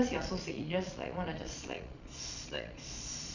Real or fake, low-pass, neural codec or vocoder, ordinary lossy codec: fake; 7.2 kHz; vocoder, 22.05 kHz, 80 mel bands, Vocos; none